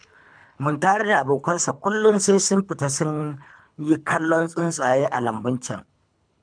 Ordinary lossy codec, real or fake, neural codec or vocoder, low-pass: none; fake; codec, 24 kHz, 3 kbps, HILCodec; 9.9 kHz